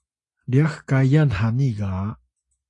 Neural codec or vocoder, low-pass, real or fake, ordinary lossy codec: none; 10.8 kHz; real; AAC, 48 kbps